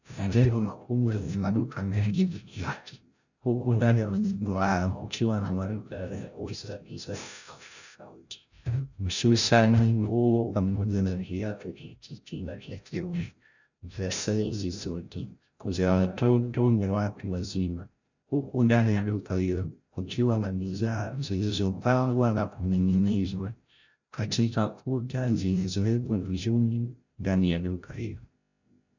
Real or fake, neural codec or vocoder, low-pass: fake; codec, 16 kHz, 0.5 kbps, FreqCodec, larger model; 7.2 kHz